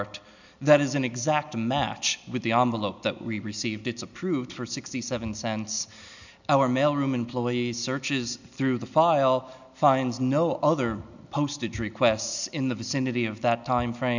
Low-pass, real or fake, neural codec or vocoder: 7.2 kHz; real; none